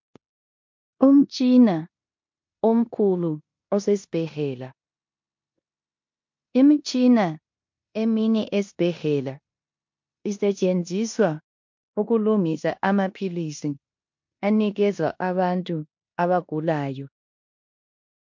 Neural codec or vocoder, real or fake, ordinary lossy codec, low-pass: codec, 16 kHz in and 24 kHz out, 0.9 kbps, LongCat-Audio-Codec, four codebook decoder; fake; MP3, 48 kbps; 7.2 kHz